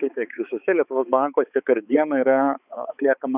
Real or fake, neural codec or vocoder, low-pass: fake; codec, 16 kHz, 4 kbps, X-Codec, HuBERT features, trained on balanced general audio; 3.6 kHz